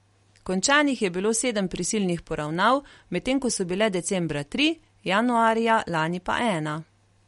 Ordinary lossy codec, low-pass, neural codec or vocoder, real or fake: MP3, 48 kbps; 19.8 kHz; none; real